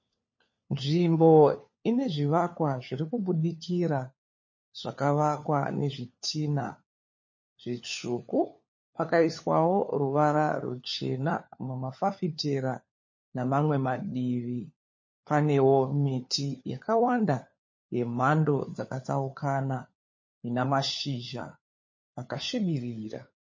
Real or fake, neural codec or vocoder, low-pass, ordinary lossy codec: fake; codec, 16 kHz, 4 kbps, FunCodec, trained on LibriTTS, 50 frames a second; 7.2 kHz; MP3, 32 kbps